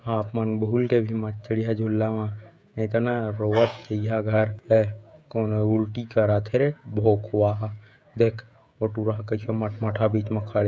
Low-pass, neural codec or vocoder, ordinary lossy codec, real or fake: none; codec, 16 kHz, 16 kbps, FreqCodec, smaller model; none; fake